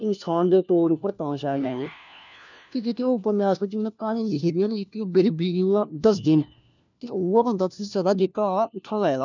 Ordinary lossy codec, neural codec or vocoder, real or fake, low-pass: none; codec, 16 kHz, 1 kbps, FunCodec, trained on LibriTTS, 50 frames a second; fake; 7.2 kHz